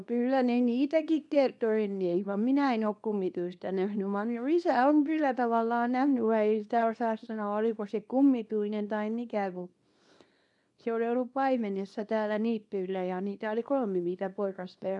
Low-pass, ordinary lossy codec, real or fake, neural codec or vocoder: 10.8 kHz; none; fake; codec, 24 kHz, 0.9 kbps, WavTokenizer, small release